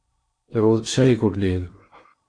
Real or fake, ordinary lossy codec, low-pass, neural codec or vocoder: fake; AAC, 48 kbps; 9.9 kHz; codec, 16 kHz in and 24 kHz out, 0.8 kbps, FocalCodec, streaming, 65536 codes